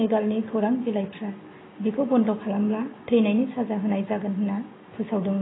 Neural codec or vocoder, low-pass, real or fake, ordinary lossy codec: none; 7.2 kHz; real; AAC, 16 kbps